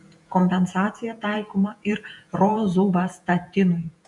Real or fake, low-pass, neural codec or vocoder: fake; 10.8 kHz; vocoder, 48 kHz, 128 mel bands, Vocos